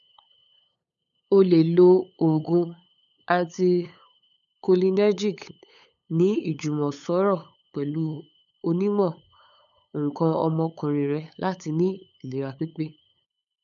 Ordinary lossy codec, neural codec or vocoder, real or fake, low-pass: none; codec, 16 kHz, 8 kbps, FunCodec, trained on LibriTTS, 25 frames a second; fake; 7.2 kHz